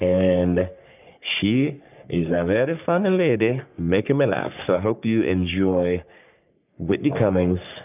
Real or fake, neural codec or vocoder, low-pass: fake; codec, 44.1 kHz, 3.4 kbps, Pupu-Codec; 3.6 kHz